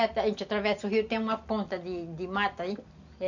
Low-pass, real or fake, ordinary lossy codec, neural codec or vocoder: 7.2 kHz; real; none; none